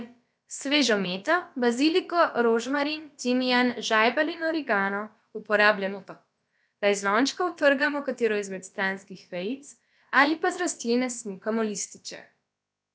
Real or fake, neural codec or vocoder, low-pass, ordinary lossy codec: fake; codec, 16 kHz, about 1 kbps, DyCAST, with the encoder's durations; none; none